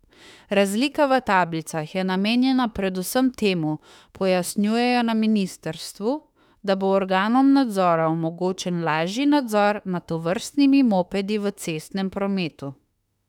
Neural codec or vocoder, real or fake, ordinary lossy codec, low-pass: autoencoder, 48 kHz, 32 numbers a frame, DAC-VAE, trained on Japanese speech; fake; none; 19.8 kHz